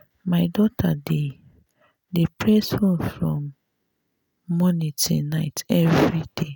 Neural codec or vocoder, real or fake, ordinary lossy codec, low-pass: none; real; none; none